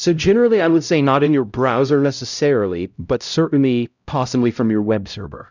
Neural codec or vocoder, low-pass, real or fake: codec, 16 kHz, 0.5 kbps, X-Codec, HuBERT features, trained on LibriSpeech; 7.2 kHz; fake